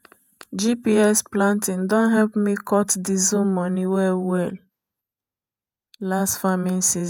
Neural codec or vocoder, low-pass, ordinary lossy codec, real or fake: vocoder, 48 kHz, 128 mel bands, Vocos; none; none; fake